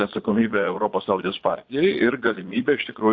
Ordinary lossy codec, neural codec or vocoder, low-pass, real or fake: AAC, 48 kbps; vocoder, 22.05 kHz, 80 mel bands, Vocos; 7.2 kHz; fake